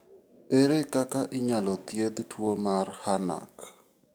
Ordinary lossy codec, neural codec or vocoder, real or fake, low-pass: none; codec, 44.1 kHz, 7.8 kbps, DAC; fake; none